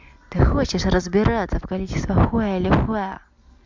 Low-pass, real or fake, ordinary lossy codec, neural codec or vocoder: 7.2 kHz; real; MP3, 64 kbps; none